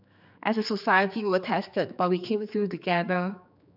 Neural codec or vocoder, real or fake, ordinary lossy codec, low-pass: codec, 16 kHz, 4 kbps, X-Codec, HuBERT features, trained on general audio; fake; none; 5.4 kHz